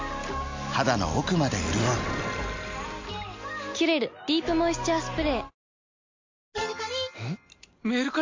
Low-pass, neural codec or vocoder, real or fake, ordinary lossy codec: 7.2 kHz; none; real; MP3, 48 kbps